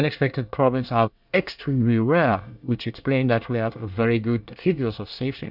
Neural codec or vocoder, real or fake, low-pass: codec, 24 kHz, 1 kbps, SNAC; fake; 5.4 kHz